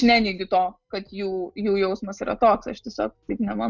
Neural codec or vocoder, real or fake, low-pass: none; real; 7.2 kHz